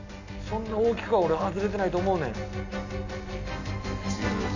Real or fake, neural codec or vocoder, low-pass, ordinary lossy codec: real; none; 7.2 kHz; none